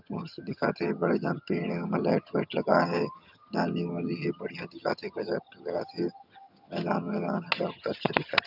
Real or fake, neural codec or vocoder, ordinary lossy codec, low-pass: fake; vocoder, 22.05 kHz, 80 mel bands, HiFi-GAN; none; 5.4 kHz